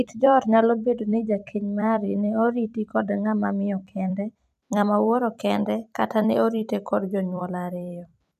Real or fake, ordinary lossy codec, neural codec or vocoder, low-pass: fake; none; vocoder, 44.1 kHz, 128 mel bands every 256 samples, BigVGAN v2; 14.4 kHz